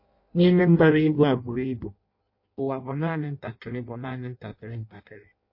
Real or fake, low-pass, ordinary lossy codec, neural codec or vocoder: fake; 5.4 kHz; MP3, 32 kbps; codec, 16 kHz in and 24 kHz out, 0.6 kbps, FireRedTTS-2 codec